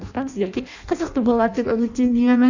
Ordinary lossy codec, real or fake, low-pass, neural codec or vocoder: none; fake; 7.2 kHz; codec, 16 kHz in and 24 kHz out, 0.6 kbps, FireRedTTS-2 codec